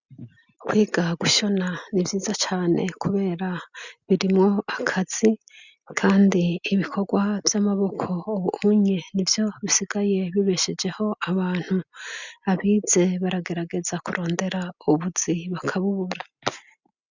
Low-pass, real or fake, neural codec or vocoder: 7.2 kHz; real; none